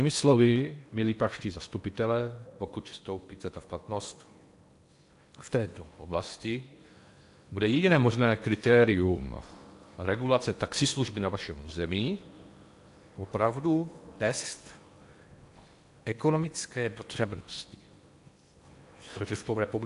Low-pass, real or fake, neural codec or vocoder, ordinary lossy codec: 10.8 kHz; fake; codec, 16 kHz in and 24 kHz out, 0.8 kbps, FocalCodec, streaming, 65536 codes; AAC, 64 kbps